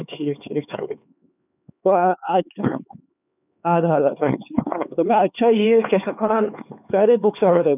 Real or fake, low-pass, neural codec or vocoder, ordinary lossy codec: fake; 3.6 kHz; codec, 16 kHz, 4 kbps, X-Codec, HuBERT features, trained on LibriSpeech; none